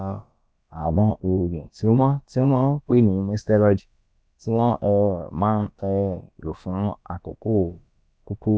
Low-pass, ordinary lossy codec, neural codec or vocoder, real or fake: none; none; codec, 16 kHz, about 1 kbps, DyCAST, with the encoder's durations; fake